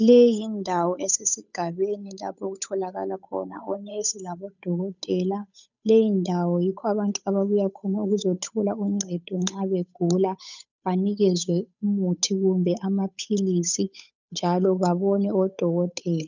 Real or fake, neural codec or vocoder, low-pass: fake; codec, 16 kHz, 8 kbps, FunCodec, trained on Chinese and English, 25 frames a second; 7.2 kHz